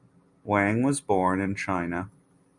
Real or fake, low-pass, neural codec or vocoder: real; 10.8 kHz; none